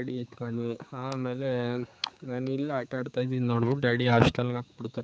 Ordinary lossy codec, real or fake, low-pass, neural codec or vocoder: none; fake; none; codec, 16 kHz, 4 kbps, X-Codec, HuBERT features, trained on general audio